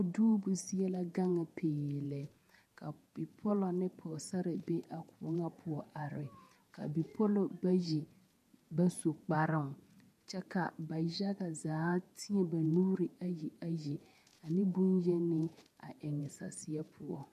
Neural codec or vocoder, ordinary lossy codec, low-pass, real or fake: vocoder, 48 kHz, 128 mel bands, Vocos; MP3, 64 kbps; 14.4 kHz; fake